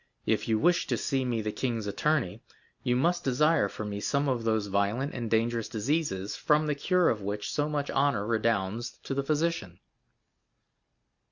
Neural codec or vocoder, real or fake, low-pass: none; real; 7.2 kHz